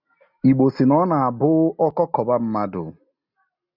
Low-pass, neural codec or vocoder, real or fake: 5.4 kHz; none; real